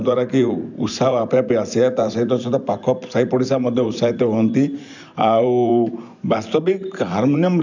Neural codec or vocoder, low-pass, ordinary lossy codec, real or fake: vocoder, 44.1 kHz, 128 mel bands every 512 samples, BigVGAN v2; 7.2 kHz; none; fake